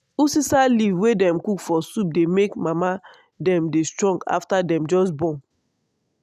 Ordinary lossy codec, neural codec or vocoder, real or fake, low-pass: none; none; real; 14.4 kHz